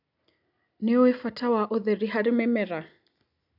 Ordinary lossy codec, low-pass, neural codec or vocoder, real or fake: none; 5.4 kHz; none; real